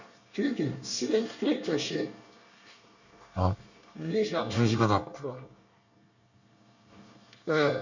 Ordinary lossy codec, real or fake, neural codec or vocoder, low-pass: none; fake; codec, 24 kHz, 1 kbps, SNAC; 7.2 kHz